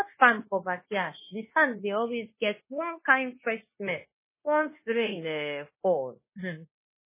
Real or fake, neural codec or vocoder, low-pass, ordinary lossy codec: fake; codec, 16 kHz, 0.9 kbps, LongCat-Audio-Codec; 3.6 kHz; MP3, 16 kbps